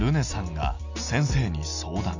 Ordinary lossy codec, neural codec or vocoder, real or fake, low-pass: none; none; real; 7.2 kHz